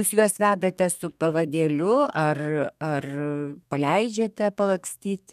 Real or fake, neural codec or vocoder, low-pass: fake; codec, 32 kHz, 1.9 kbps, SNAC; 14.4 kHz